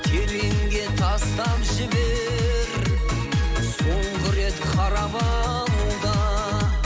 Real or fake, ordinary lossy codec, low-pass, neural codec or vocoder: real; none; none; none